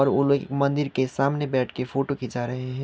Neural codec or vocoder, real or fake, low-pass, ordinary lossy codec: none; real; none; none